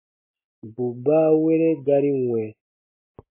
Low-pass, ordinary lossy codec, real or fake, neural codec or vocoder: 3.6 kHz; MP3, 16 kbps; real; none